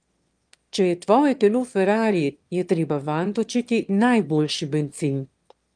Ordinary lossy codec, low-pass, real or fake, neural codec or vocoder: Opus, 32 kbps; 9.9 kHz; fake; autoencoder, 22.05 kHz, a latent of 192 numbers a frame, VITS, trained on one speaker